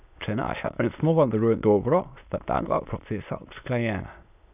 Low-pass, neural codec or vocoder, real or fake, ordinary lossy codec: 3.6 kHz; autoencoder, 22.05 kHz, a latent of 192 numbers a frame, VITS, trained on many speakers; fake; none